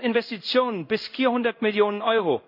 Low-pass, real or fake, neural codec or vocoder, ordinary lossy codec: 5.4 kHz; fake; codec, 16 kHz in and 24 kHz out, 1 kbps, XY-Tokenizer; MP3, 48 kbps